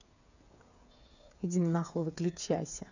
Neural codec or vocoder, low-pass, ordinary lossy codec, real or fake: codec, 16 kHz, 8 kbps, FreqCodec, smaller model; 7.2 kHz; none; fake